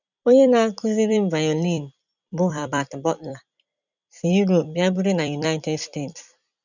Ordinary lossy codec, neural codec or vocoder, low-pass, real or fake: none; vocoder, 44.1 kHz, 128 mel bands every 256 samples, BigVGAN v2; 7.2 kHz; fake